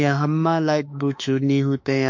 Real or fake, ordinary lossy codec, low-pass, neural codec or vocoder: fake; MP3, 48 kbps; 7.2 kHz; autoencoder, 48 kHz, 32 numbers a frame, DAC-VAE, trained on Japanese speech